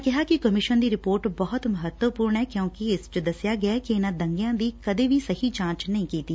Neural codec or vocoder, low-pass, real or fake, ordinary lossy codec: none; none; real; none